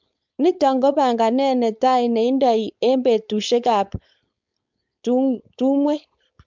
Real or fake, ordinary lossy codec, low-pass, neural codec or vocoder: fake; MP3, 64 kbps; 7.2 kHz; codec, 16 kHz, 4.8 kbps, FACodec